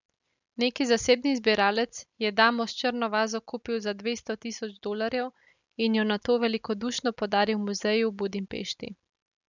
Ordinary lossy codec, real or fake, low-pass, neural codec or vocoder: none; real; 7.2 kHz; none